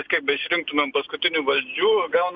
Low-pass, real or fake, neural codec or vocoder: 7.2 kHz; real; none